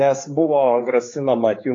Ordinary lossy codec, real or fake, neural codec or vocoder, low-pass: AAC, 32 kbps; fake; codec, 16 kHz, 4 kbps, X-Codec, HuBERT features, trained on general audio; 7.2 kHz